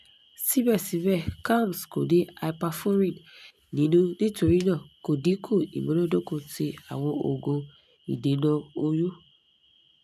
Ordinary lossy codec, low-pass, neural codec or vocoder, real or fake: none; 14.4 kHz; none; real